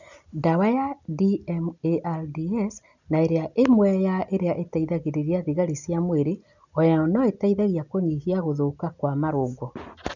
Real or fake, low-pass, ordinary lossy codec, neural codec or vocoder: real; 7.2 kHz; none; none